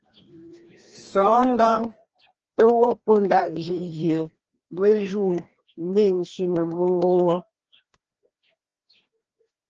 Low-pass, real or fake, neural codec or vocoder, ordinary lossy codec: 7.2 kHz; fake; codec, 16 kHz, 1 kbps, FreqCodec, larger model; Opus, 16 kbps